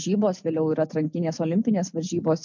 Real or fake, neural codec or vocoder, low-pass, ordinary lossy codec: real; none; 7.2 kHz; MP3, 64 kbps